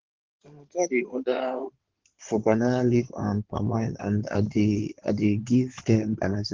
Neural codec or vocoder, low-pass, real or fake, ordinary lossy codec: codec, 16 kHz in and 24 kHz out, 2.2 kbps, FireRedTTS-2 codec; 7.2 kHz; fake; Opus, 16 kbps